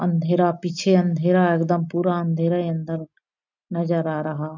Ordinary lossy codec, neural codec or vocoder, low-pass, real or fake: none; none; 7.2 kHz; real